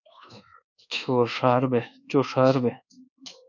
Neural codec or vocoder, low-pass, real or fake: codec, 24 kHz, 1.2 kbps, DualCodec; 7.2 kHz; fake